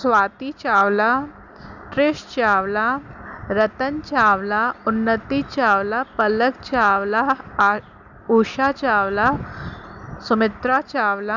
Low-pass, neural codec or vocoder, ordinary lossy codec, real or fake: 7.2 kHz; none; none; real